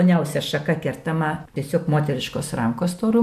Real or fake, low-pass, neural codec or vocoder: real; 14.4 kHz; none